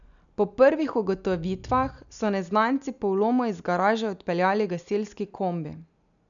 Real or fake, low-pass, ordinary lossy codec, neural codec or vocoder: real; 7.2 kHz; none; none